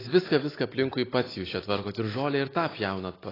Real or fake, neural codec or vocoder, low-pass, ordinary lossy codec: real; none; 5.4 kHz; AAC, 24 kbps